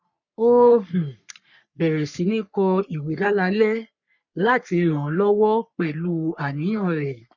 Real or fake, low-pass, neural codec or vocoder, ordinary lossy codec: fake; 7.2 kHz; codec, 44.1 kHz, 3.4 kbps, Pupu-Codec; none